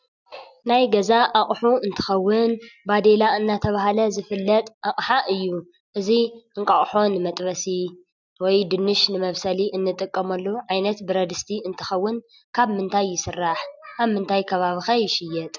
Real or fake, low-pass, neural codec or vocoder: real; 7.2 kHz; none